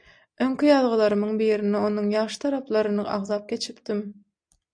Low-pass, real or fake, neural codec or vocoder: 9.9 kHz; real; none